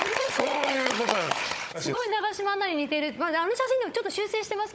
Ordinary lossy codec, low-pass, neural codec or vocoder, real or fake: none; none; codec, 16 kHz, 16 kbps, FunCodec, trained on Chinese and English, 50 frames a second; fake